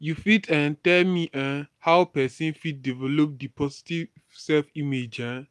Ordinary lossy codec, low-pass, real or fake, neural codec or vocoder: none; none; real; none